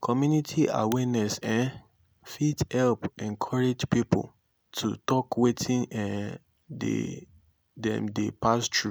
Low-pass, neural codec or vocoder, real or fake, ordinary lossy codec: none; none; real; none